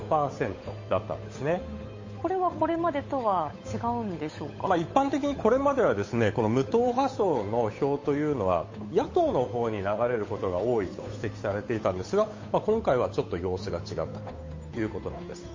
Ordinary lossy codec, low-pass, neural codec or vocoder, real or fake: MP3, 32 kbps; 7.2 kHz; codec, 16 kHz, 8 kbps, FunCodec, trained on Chinese and English, 25 frames a second; fake